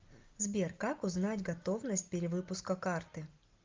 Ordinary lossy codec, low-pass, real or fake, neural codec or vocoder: Opus, 32 kbps; 7.2 kHz; real; none